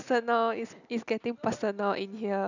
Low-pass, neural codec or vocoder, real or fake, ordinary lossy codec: 7.2 kHz; none; real; none